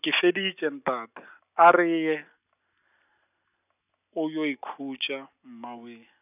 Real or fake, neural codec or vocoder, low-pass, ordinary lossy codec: real; none; 3.6 kHz; none